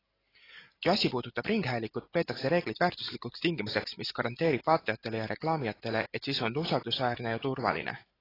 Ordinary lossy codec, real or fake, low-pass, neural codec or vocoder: AAC, 24 kbps; real; 5.4 kHz; none